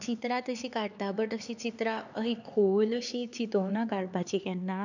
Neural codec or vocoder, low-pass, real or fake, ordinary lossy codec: codec, 16 kHz, 4 kbps, X-Codec, HuBERT features, trained on LibriSpeech; 7.2 kHz; fake; none